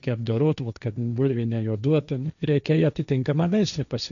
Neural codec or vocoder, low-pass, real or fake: codec, 16 kHz, 1.1 kbps, Voila-Tokenizer; 7.2 kHz; fake